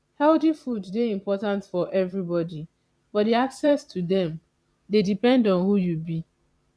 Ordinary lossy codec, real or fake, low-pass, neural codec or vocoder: none; fake; none; vocoder, 22.05 kHz, 80 mel bands, Vocos